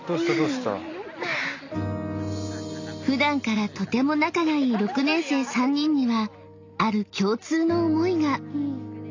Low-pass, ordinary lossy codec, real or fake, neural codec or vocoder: 7.2 kHz; AAC, 48 kbps; real; none